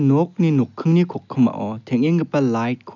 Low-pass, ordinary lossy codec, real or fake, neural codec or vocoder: 7.2 kHz; none; real; none